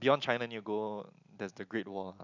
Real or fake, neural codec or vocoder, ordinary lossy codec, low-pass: real; none; none; 7.2 kHz